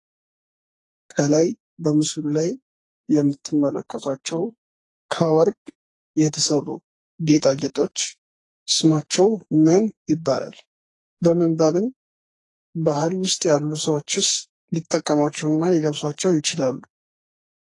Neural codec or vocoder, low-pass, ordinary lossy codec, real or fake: codec, 44.1 kHz, 2.6 kbps, SNAC; 10.8 kHz; AAC, 32 kbps; fake